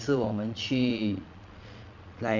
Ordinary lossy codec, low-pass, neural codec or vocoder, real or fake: none; 7.2 kHz; vocoder, 22.05 kHz, 80 mel bands, Vocos; fake